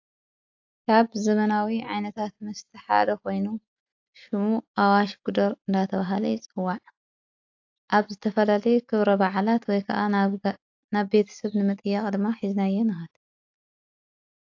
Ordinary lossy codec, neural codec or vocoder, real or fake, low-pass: AAC, 48 kbps; none; real; 7.2 kHz